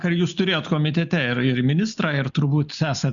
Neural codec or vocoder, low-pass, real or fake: none; 7.2 kHz; real